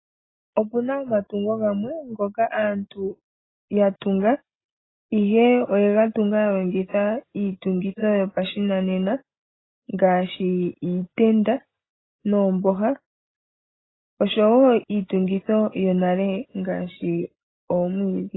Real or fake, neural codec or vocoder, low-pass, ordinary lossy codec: real; none; 7.2 kHz; AAC, 16 kbps